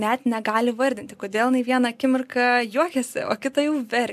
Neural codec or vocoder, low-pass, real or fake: none; 14.4 kHz; real